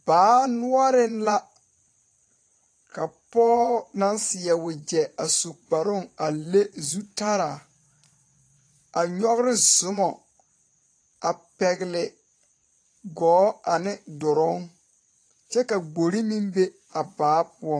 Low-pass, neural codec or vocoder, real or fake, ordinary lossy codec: 9.9 kHz; vocoder, 22.05 kHz, 80 mel bands, Vocos; fake; AAC, 48 kbps